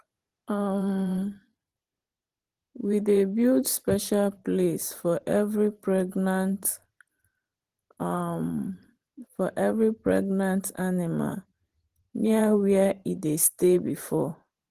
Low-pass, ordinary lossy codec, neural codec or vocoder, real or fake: 14.4 kHz; Opus, 16 kbps; vocoder, 44.1 kHz, 128 mel bands every 512 samples, BigVGAN v2; fake